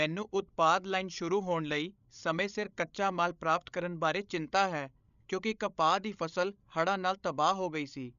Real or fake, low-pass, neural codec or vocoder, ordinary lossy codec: fake; 7.2 kHz; codec, 16 kHz, 16 kbps, FreqCodec, larger model; none